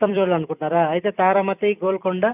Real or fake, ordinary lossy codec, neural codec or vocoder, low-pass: fake; none; vocoder, 44.1 kHz, 128 mel bands every 256 samples, BigVGAN v2; 3.6 kHz